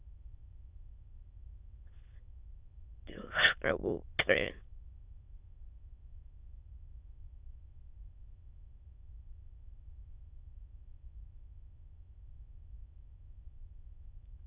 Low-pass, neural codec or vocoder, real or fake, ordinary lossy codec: 3.6 kHz; autoencoder, 22.05 kHz, a latent of 192 numbers a frame, VITS, trained on many speakers; fake; Opus, 64 kbps